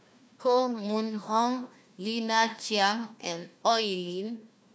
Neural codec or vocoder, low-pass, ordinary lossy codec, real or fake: codec, 16 kHz, 1 kbps, FunCodec, trained on Chinese and English, 50 frames a second; none; none; fake